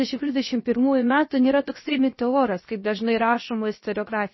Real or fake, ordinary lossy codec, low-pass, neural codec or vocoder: fake; MP3, 24 kbps; 7.2 kHz; codec, 16 kHz, 0.7 kbps, FocalCodec